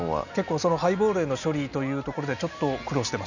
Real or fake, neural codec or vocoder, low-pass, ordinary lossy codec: real; none; 7.2 kHz; none